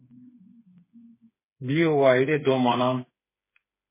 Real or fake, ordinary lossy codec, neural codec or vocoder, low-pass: fake; MP3, 16 kbps; codec, 16 kHz, 4 kbps, FreqCodec, smaller model; 3.6 kHz